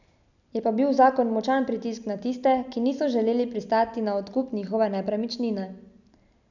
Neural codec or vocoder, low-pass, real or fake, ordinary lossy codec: none; 7.2 kHz; real; none